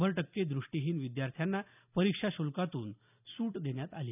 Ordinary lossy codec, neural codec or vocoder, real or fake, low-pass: none; none; real; 3.6 kHz